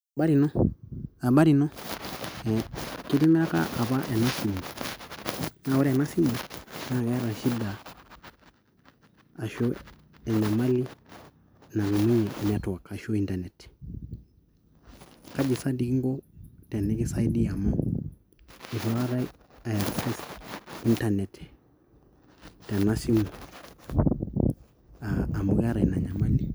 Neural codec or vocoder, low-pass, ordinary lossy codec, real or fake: none; none; none; real